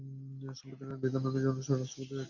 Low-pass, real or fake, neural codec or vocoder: 7.2 kHz; real; none